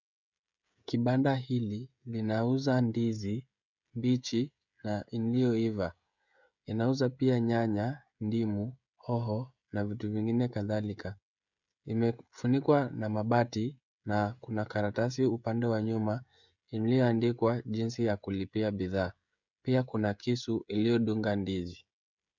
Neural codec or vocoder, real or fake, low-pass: codec, 16 kHz, 16 kbps, FreqCodec, smaller model; fake; 7.2 kHz